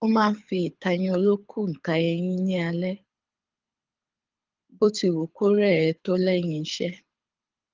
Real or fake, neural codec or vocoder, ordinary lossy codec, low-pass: fake; codec, 24 kHz, 6 kbps, HILCodec; Opus, 32 kbps; 7.2 kHz